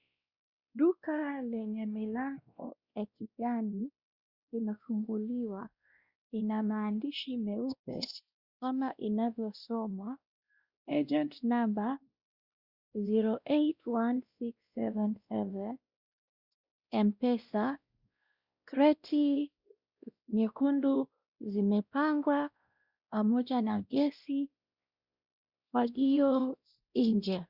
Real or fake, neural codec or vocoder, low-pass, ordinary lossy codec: fake; codec, 16 kHz, 1 kbps, X-Codec, WavLM features, trained on Multilingual LibriSpeech; 5.4 kHz; Opus, 64 kbps